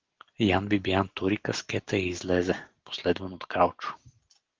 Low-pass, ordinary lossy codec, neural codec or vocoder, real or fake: 7.2 kHz; Opus, 16 kbps; none; real